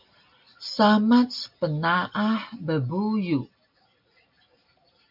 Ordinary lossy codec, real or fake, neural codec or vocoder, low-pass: MP3, 48 kbps; real; none; 5.4 kHz